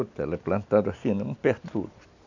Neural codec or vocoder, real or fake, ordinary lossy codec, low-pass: none; real; none; 7.2 kHz